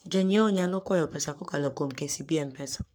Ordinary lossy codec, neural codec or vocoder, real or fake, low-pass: none; codec, 44.1 kHz, 3.4 kbps, Pupu-Codec; fake; none